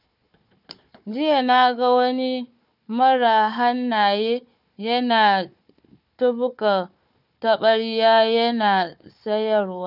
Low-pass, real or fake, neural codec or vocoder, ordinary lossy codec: 5.4 kHz; fake; codec, 16 kHz, 4 kbps, FunCodec, trained on Chinese and English, 50 frames a second; none